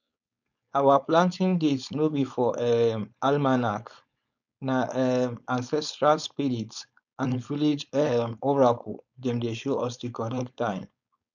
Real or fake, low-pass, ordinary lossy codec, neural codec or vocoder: fake; 7.2 kHz; none; codec, 16 kHz, 4.8 kbps, FACodec